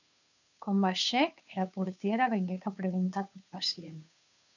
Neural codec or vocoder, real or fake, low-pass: codec, 16 kHz, 2 kbps, FunCodec, trained on Chinese and English, 25 frames a second; fake; 7.2 kHz